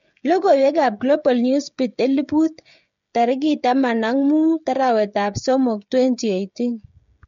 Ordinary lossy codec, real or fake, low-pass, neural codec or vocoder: MP3, 48 kbps; fake; 7.2 kHz; codec, 16 kHz, 16 kbps, FreqCodec, smaller model